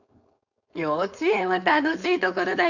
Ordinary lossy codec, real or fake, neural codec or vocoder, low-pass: none; fake; codec, 16 kHz, 4.8 kbps, FACodec; 7.2 kHz